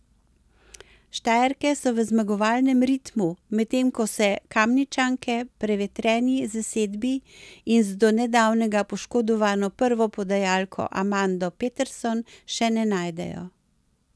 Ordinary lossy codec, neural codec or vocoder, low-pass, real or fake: none; none; none; real